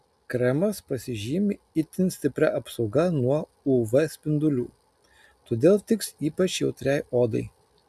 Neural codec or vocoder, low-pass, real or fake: none; 14.4 kHz; real